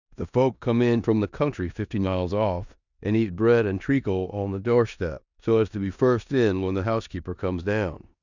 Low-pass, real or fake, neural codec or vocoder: 7.2 kHz; fake; codec, 16 kHz in and 24 kHz out, 0.9 kbps, LongCat-Audio-Codec, four codebook decoder